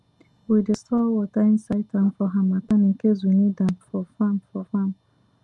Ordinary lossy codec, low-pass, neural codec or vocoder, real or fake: Opus, 32 kbps; 10.8 kHz; none; real